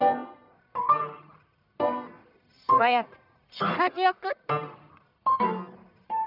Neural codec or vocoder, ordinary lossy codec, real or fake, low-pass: codec, 44.1 kHz, 1.7 kbps, Pupu-Codec; none; fake; 5.4 kHz